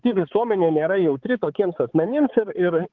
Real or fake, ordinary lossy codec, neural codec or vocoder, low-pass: fake; Opus, 16 kbps; codec, 16 kHz, 4 kbps, X-Codec, HuBERT features, trained on balanced general audio; 7.2 kHz